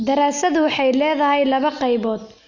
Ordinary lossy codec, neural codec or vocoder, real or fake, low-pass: none; none; real; 7.2 kHz